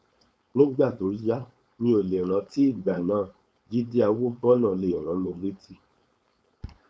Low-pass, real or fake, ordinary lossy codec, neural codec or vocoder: none; fake; none; codec, 16 kHz, 4.8 kbps, FACodec